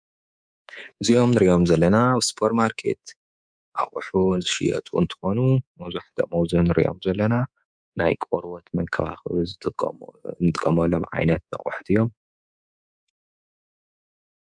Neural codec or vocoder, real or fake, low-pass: codec, 24 kHz, 3.1 kbps, DualCodec; fake; 9.9 kHz